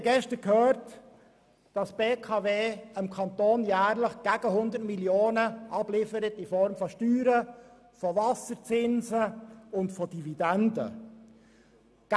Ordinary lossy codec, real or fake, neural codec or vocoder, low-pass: none; real; none; none